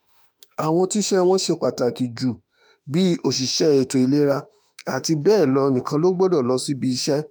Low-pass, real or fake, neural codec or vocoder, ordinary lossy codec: none; fake; autoencoder, 48 kHz, 32 numbers a frame, DAC-VAE, trained on Japanese speech; none